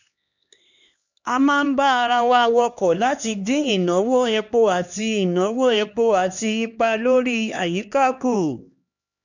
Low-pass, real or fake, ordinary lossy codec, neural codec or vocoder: 7.2 kHz; fake; none; codec, 16 kHz, 2 kbps, X-Codec, HuBERT features, trained on LibriSpeech